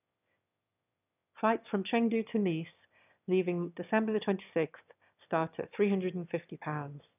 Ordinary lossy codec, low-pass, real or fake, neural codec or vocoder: none; 3.6 kHz; fake; autoencoder, 22.05 kHz, a latent of 192 numbers a frame, VITS, trained on one speaker